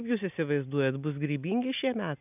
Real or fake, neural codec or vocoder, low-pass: real; none; 3.6 kHz